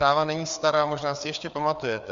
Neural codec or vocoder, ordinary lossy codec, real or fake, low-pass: codec, 16 kHz, 4 kbps, FreqCodec, larger model; Opus, 64 kbps; fake; 7.2 kHz